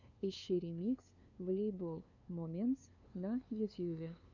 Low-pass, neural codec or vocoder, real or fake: 7.2 kHz; codec, 16 kHz, 2 kbps, FunCodec, trained on LibriTTS, 25 frames a second; fake